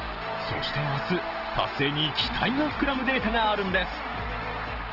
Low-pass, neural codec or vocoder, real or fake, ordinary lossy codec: 5.4 kHz; none; real; Opus, 16 kbps